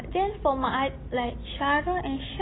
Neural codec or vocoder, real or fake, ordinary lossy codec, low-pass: none; real; AAC, 16 kbps; 7.2 kHz